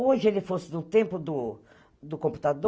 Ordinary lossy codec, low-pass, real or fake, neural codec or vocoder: none; none; real; none